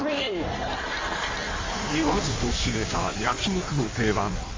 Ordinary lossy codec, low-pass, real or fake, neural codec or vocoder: Opus, 32 kbps; 7.2 kHz; fake; codec, 16 kHz in and 24 kHz out, 1.1 kbps, FireRedTTS-2 codec